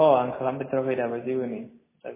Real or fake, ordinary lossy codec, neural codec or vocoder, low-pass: real; MP3, 16 kbps; none; 3.6 kHz